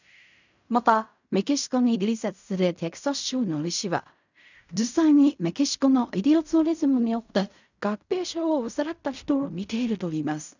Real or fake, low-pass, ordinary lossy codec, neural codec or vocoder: fake; 7.2 kHz; none; codec, 16 kHz in and 24 kHz out, 0.4 kbps, LongCat-Audio-Codec, fine tuned four codebook decoder